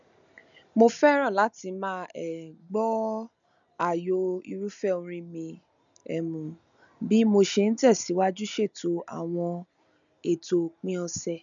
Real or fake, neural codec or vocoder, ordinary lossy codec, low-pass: real; none; none; 7.2 kHz